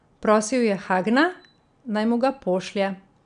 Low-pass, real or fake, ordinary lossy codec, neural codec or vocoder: 9.9 kHz; real; none; none